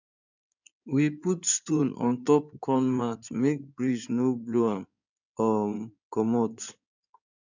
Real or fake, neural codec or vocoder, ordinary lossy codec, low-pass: fake; codec, 16 kHz in and 24 kHz out, 2.2 kbps, FireRedTTS-2 codec; none; 7.2 kHz